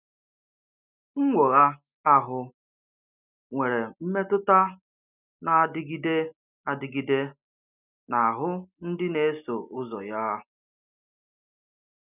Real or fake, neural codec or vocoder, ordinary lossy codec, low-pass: real; none; none; 3.6 kHz